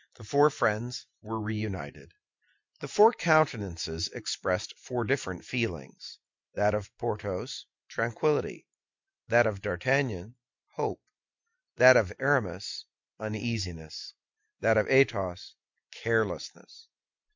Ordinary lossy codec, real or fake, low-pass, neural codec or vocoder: MP3, 64 kbps; real; 7.2 kHz; none